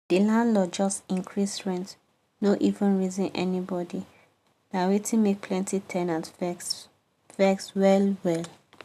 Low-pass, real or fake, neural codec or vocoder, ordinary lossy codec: 14.4 kHz; real; none; none